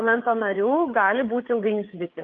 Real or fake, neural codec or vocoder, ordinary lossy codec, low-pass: fake; codec, 16 kHz, 4 kbps, FreqCodec, larger model; Opus, 32 kbps; 7.2 kHz